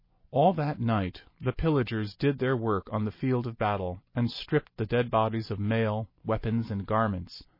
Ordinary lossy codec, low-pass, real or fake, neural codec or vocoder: MP3, 24 kbps; 5.4 kHz; real; none